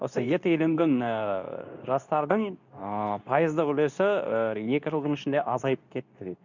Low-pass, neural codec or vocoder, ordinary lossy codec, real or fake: 7.2 kHz; codec, 24 kHz, 0.9 kbps, WavTokenizer, medium speech release version 2; none; fake